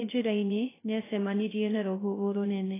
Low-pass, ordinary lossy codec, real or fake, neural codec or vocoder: 3.6 kHz; AAC, 16 kbps; fake; codec, 16 kHz, 0.2 kbps, FocalCodec